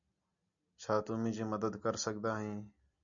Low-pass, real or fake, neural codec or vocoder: 7.2 kHz; real; none